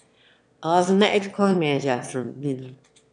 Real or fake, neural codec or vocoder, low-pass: fake; autoencoder, 22.05 kHz, a latent of 192 numbers a frame, VITS, trained on one speaker; 9.9 kHz